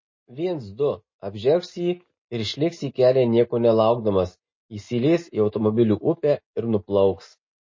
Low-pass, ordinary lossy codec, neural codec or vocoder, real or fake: 7.2 kHz; MP3, 32 kbps; none; real